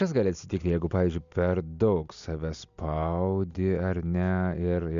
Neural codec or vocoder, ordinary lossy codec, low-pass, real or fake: none; MP3, 96 kbps; 7.2 kHz; real